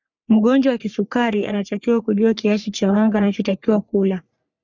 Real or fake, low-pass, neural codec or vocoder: fake; 7.2 kHz; codec, 44.1 kHz, 3.4 kbps, Pupu-Codec